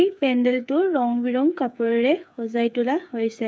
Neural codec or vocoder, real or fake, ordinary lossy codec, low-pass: codec, 16 kHz, 8 kbps, FreqCodec, smaller model; fake; none; none